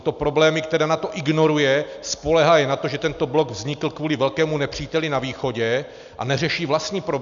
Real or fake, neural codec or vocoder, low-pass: real; none; 7.2 kHz